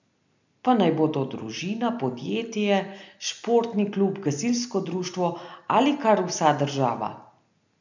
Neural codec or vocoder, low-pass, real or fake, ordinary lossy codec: none; 7.2 kHz; real; none